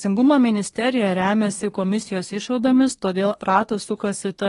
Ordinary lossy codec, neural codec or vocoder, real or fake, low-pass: AAC, 32 kbps; codec, 24 kHz, 1 kbps, SNAC; fake; 10.8 kHz